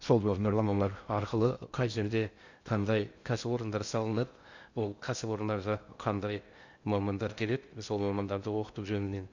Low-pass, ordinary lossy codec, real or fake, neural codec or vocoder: 7.2 kHz; Opus, 64 kbps; fake; codec, 16 kHz in and 24 kHz out, 0.6 kbps, FocalCodec, streaming, 2048 codes